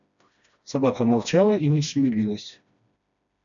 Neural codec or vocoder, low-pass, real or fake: codec, 16 kHz, 1 kbps, FreqCodec, smaller model; 7.2 kHz; fake